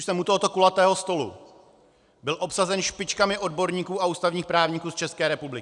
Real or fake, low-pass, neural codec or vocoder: real; 10.8 kHz; none